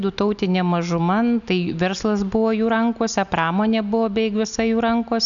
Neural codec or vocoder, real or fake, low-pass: none; real; 7.2 kHz